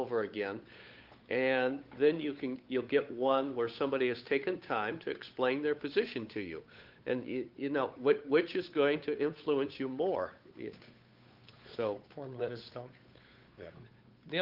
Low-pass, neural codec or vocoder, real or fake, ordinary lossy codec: 5.4 kHz; codec, 16 kHz, 8 kbps, FunCodec, trained on Chinese and English, 25 frames a second; fake; Opus, 24 kbps